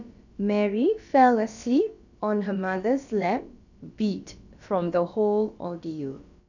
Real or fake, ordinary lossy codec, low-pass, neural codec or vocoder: fake; none; 7.2 kHz; codec, 16 kHz, about 1 kbps, DyCAST, with the encoder's durations